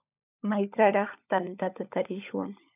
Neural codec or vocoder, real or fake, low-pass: codec, 16 kHz, 16 kbps, FunCodec, trained on LibriTTS, 50 frames a second; fake; 3.6 kHz